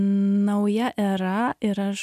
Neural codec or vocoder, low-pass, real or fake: none; 14.4 kHz; real